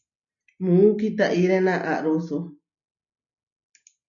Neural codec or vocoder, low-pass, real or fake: none; 7.2 kHz; real